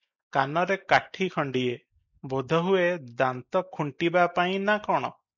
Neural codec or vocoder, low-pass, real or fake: none; 7.2 kHz; real